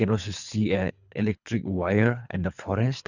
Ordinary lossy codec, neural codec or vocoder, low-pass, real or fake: none; codec, 24 kHz, 3 kbps, HILCodec; 7.2 kHz; fake